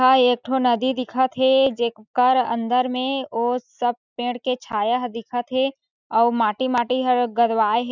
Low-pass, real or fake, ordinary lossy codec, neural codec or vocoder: 7.2 kHz; real; none; none